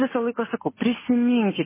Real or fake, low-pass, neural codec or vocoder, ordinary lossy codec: real; 3.6 kHz; none; MP3, 16 kbps